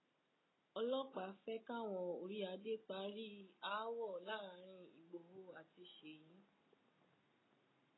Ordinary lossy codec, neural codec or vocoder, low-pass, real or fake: AAC, 16 kbps; none; 7.2 kHz; real